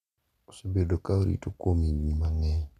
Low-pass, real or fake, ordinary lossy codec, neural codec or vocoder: 14.4 kHz; real; none; none